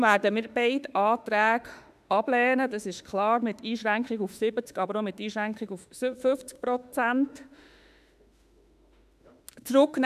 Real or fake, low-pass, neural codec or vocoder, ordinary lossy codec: fake; 14.4 kHz; autoencoder, 48 kHz, 32 numbers a frame, DAC-VAE, trained on Japanese speech; none